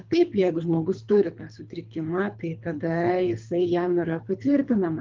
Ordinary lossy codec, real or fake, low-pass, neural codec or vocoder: Opus, 24 kbps; fake; 7.2 kHz; codec, 24 kHz, 3 kbps, HILCodec